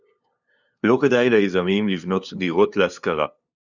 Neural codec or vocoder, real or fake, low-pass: codec, 16 kHz, 2 kbps, FunCodec, trained on LibriTTS, 25 frames a second; fake; 7.2 kHz